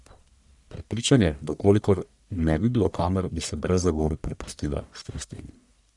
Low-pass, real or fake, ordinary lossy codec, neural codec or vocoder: 10.8 kHz; fake; none; codec, 44.1 kHz, 1.7 kbps, Pupu-Codec